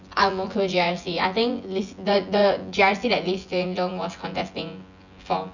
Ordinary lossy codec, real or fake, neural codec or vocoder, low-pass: none; fake; vocoder, 24 kHz, 100 mel bands, Vocos; 7.2 kHz